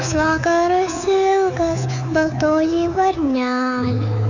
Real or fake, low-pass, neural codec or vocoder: fake; 7.2 kHz; codec, 24 kHz, 3.1 kbps, DualCodec